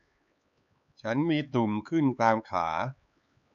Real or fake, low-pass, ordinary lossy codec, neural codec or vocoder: fake; 7.2 kHz; none; codec, 16 kHz, 4 kbps, X-Codec, HuBERT features, trained on LibriSpeech